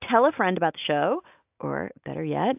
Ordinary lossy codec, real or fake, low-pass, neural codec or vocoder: AAC, 32 kbps; real; 3.6 kHz; none